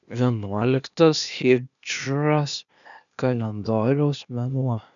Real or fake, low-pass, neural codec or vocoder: fake; 7.2 kHz; codec, 16 kHz, 0.8 kbps, ZipCodec